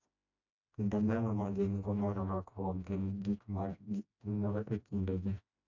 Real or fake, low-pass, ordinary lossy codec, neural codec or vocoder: fake; 7.2 kHz; none; codec, 16 kHz, 1 kbps, FreqCodec, smaller model